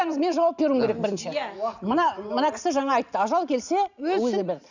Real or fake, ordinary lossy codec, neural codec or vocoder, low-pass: real; none; none; 7.2 kHz